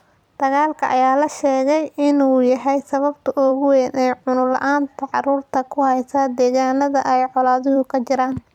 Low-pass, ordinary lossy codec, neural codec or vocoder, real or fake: 19.8 kHz; none; vocoder, 44.1 kHz, 128 mel bands every 256 samples, BigVGAN v2; fake